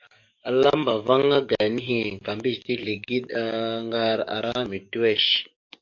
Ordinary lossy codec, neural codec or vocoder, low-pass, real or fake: MP3, 48 kbps; codec, 44.1 kHz, 7.8 kbps, DAC; 7.2 kHz; fake